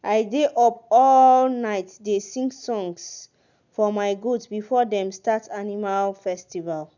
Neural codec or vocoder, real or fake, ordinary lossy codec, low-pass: none; real; none; 7.2 kHz